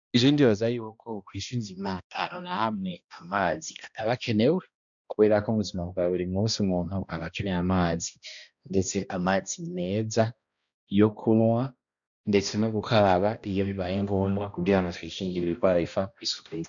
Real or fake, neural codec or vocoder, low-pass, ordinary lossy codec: fake; codec, 16 kHz, 1 kbps, X-Codec, HuBERT features, trained on balanced general audio; 7.2 kHz; MP3, 96 kbps